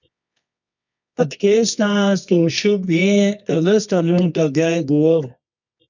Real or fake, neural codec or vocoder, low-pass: fake; codec, 24 kHz, 0.9 kbps, WavTokenizer, medium music audio release; 7.2 kHz